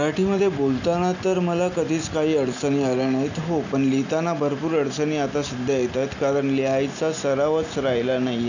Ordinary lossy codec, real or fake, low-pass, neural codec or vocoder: none; real; 7.2 kHz; none